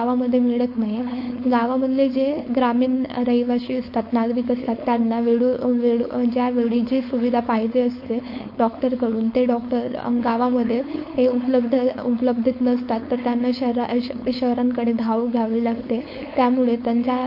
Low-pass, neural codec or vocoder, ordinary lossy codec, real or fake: 5.4 kHz; codec, 16 kHz, 4.8 kbps, FACodec; MP3, 32 kbps; fake